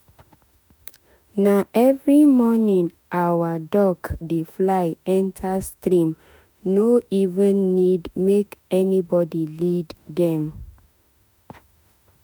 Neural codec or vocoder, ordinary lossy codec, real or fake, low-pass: autoencoder, 48 kHz, 32 numbers a frame, DAC-VAE, trained on Japanese speech; none; fake; none